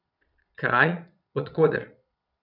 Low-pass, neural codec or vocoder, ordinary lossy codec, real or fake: 5.4 kHz; vocoder, 22.05 kHz, 80 mel bands, Vocos; none; fake